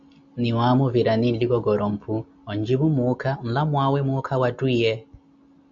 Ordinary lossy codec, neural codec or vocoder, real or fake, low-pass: MP3, 64 kbps; none; real; 7.2 kHz